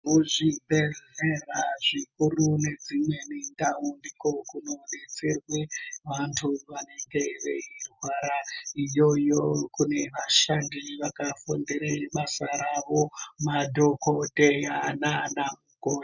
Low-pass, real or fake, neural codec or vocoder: 7.2 kHz; real; none